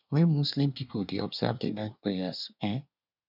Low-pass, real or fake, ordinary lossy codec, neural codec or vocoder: 5.4 kHz; fake; none; codec, 24 kHz, 1 kbps, SNAC